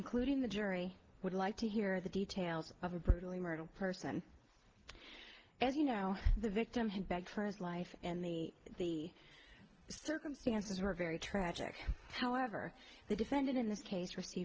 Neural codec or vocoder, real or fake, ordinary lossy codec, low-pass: none; real; Opus, 16 kbps; 7.2 kHz